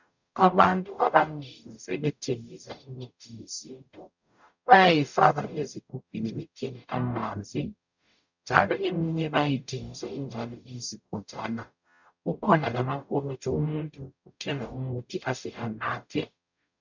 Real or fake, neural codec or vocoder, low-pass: fake; codec, 44.1 kHz, 0.9 kbps, DAC; 7.2 kHz